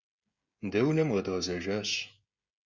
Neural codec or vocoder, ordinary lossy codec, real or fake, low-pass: codec, 16 kHz, 16 kbps, FreqCodec, smaller model; Opus, 64 kbps; fake; 7.2 kHz